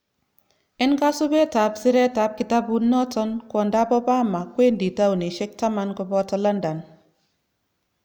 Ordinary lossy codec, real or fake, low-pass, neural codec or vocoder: none; real; none; none